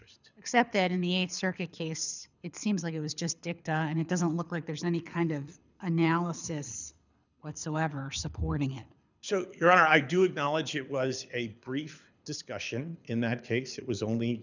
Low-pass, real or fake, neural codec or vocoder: 7.2 kHz; fake; codec, 24 kHz, 6 kbps, HILCodec